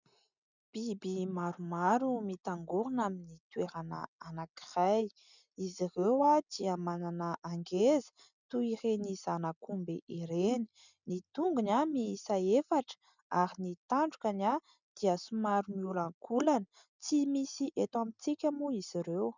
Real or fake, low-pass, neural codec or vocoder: real; 7.2 kHz; none